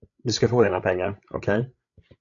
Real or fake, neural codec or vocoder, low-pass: fake; codec, 16 kHz, 16 kbps, FreqCodec, larger model; 7.2 kHz